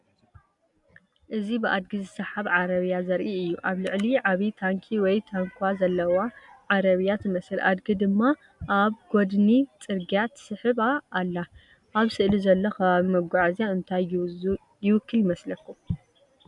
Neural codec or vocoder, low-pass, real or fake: none; 10.8 kHz; real